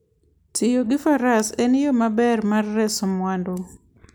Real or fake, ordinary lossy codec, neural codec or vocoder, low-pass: real; none; none; none